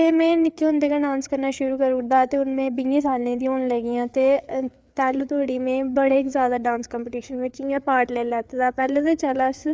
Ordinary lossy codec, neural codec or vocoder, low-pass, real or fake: none; codec, 16 kHz, 4 kbps, FreqCodec, larger model; none; fake